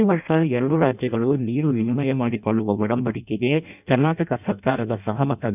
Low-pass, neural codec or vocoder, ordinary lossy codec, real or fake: 3.6 kHz; codec, 16 kHz in and 24 kHz out, 0.6 kbps, FireRedTTS-2 codec; none; fake